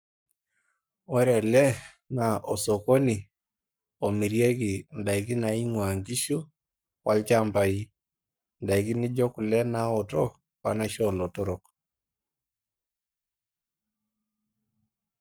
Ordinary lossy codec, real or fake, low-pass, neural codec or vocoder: none; fake; none; codec, 44.1 kHz, 7.8 kbps, Pupu-Codec